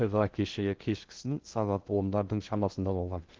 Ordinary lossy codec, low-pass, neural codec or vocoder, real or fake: Opus, 16 kbps; 7.2 kHz; codec, 16 kHz in and 24 kHz out, 0.6 kbps, FocalCodec, streaming, 2048 codes; fake